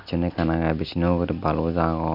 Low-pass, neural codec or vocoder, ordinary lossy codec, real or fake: 5.4 kHz; none; none; real